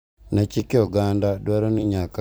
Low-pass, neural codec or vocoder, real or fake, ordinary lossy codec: none; vocoder, 44.1 kHz, 128 mel bands every 256 samples, BigVGAN v2; fake; none